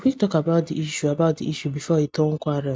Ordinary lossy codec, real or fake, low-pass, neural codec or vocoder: none; real; none; none